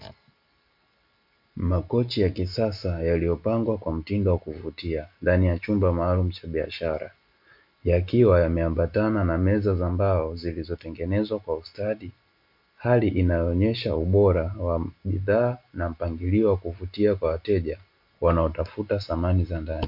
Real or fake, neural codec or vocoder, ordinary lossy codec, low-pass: real; none; MP3, 48 kbps; 5.4 kHz